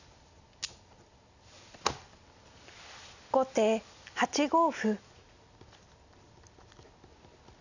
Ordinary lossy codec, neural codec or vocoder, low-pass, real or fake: none; none; 7.2 kHz; real